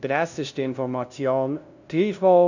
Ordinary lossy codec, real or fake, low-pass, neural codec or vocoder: none; fake; 7.2 kHz; codec, 16 kHz, 0.5 kbps, FunCodec, trained on LibriTTS, 25 frames a second